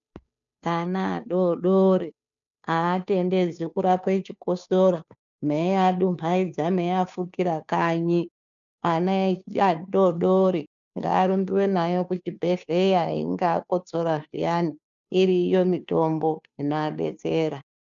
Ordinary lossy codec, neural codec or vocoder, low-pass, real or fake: MP3, 96 kbps; codec, 16 kHz, 2 kbps, FunCodec, trained on Chinese and English, 25 frames a second; 7.2 kHz; fake